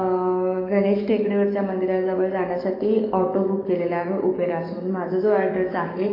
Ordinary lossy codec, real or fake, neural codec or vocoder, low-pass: AAC, 32 kbps; fake; codec, 44.1 kHz, 7.8 kbps, DAC; 5.4 kHz